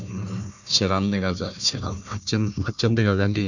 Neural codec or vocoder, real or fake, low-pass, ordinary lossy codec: codec, 16 kHz, 1 kbps, FunCodec, trained on Chinese and English, 50 frames a second; fake; 7.2 kHz; none